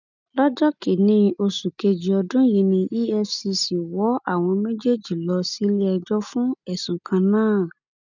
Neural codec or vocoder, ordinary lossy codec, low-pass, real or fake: none; none; 7.2 kHz; real